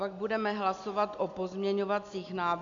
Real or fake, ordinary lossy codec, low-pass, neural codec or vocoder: real; AAC, 64 kbps; 7.2 kHz; none